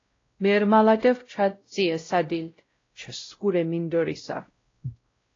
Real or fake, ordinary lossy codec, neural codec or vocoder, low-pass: fake; AAC, 32 kbps; codec, 16 kHz, 0.5 kbps, X-Codec, WavLM features, trained on Multilingual LibriSpeech; 7.2 kHz